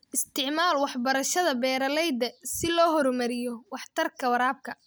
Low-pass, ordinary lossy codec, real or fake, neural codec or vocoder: none; none; real; none